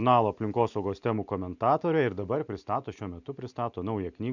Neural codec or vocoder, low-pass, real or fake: none; 7.2 kHz; real